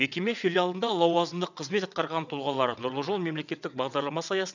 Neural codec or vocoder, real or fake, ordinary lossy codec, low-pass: vocoder, 22.05 kHz, 80 mel bands, WaveNeXt; fake; none; 7.2 kHz